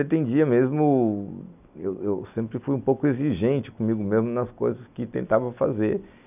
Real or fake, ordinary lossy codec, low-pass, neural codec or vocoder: real; none; 3.6 kHz; none